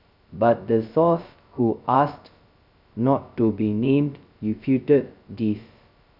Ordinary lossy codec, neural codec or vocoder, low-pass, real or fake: none; codec, 16 kHz, 0.2 kbps, FocalCodec; 5.4 kHz; fake